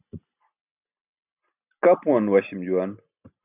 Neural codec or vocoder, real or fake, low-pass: none; real; 3.6 kHz